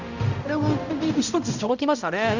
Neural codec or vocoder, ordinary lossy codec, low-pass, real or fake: codec, 16 kHz, 0.5 kbps, X-Codec, HuBERT features, trained on balanced general audio; none; 7.2 kHz; fake